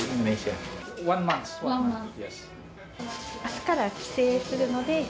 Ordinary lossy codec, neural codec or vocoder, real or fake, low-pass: none; none; real; none